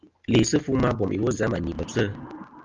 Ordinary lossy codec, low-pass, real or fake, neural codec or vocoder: Opus, 32 kbps; 7.2 kHz; real; none